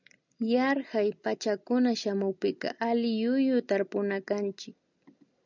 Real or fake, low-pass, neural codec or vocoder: real; 7.2 kHz; none